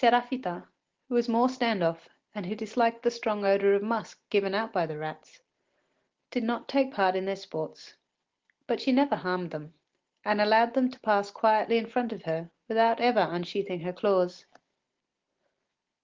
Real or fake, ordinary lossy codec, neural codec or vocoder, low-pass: real; Opus, 16 kbps; none; 7.2 kHz